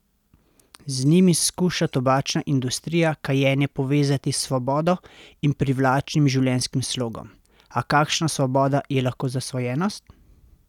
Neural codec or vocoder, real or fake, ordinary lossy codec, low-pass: none; real; none; 19.8 kHz